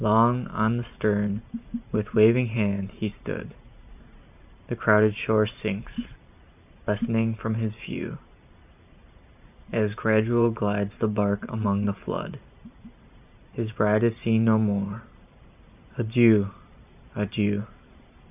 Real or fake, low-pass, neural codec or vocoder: fake; 3.6 kHz; vocoder, 44.1 kHz, 128 mel bands every 512 samples, BigVGAN v2